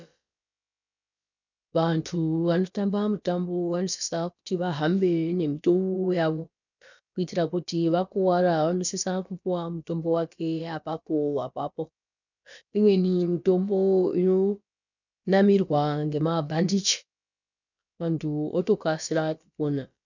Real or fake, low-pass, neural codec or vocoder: fake; 7.2 kHz; codec, 16 kHz, about 1 kbps, DyCAST, with the encoder's durations